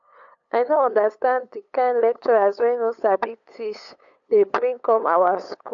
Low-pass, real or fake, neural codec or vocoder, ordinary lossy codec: 7.2 kHz; fake; codec, 16 kHz, 8 kbps, FunCodec, trained on LibriTTS, 25 frames a second; AAC, 64 kbps